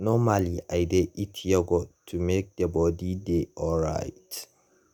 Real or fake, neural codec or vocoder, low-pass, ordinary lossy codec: real; none; none; none